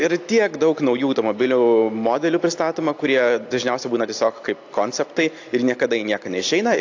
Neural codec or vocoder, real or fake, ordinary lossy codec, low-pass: none; real; AAC, 48 kbps; 7.2 kHz